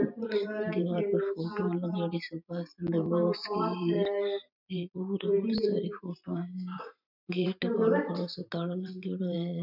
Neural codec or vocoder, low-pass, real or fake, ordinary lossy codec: vocoder, 44.1 kHz, 128 mel bands every 512 samples, BigVGAN v2; 5.4 kHz; fake; none